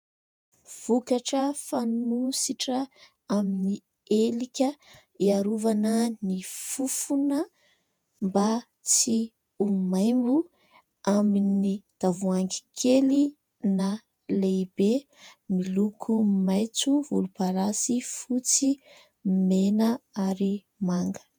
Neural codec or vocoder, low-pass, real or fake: vocoder, 48 kHz, 128 mel bands, Vocos; 19.8 kHz; fake